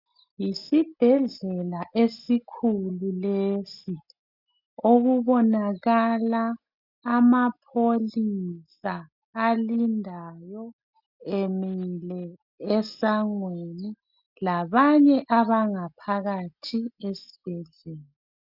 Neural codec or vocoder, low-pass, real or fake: none; 5.4 kHz; real